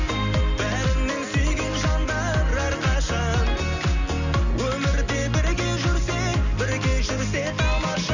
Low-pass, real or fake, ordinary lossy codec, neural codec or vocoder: 7.2 kHz; real; none; none